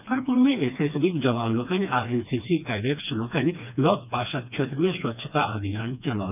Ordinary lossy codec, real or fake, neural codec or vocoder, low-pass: none; fake; codec, 16 kHz, 2 kbps, FreqCodec, smaller model; 3.6 kHz